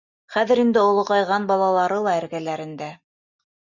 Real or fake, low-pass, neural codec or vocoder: real; 7.2 kHz; none